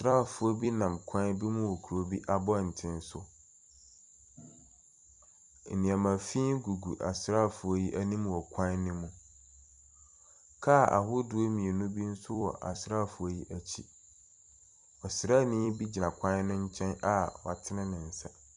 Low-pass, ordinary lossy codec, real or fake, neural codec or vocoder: 10.8 kHz; Opus, 64 kbps; fake; vocoder, 44.1 kHz, 128 mel bands every 256 samples, BigVGAN v2